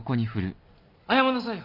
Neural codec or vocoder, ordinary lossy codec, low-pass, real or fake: none; none; 5.4 kHz; real